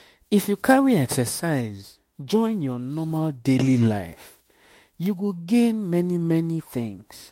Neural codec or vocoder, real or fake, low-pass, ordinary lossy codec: autoencoder, 48 kHz, 32 numbers a frame, DAC-VAE, trained on Japanese speech; fake; 19.8 kHz; MP3, 64 kbps